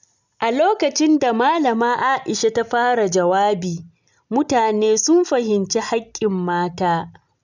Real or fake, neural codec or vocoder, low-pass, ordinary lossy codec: real; none; 7.2 kHz; none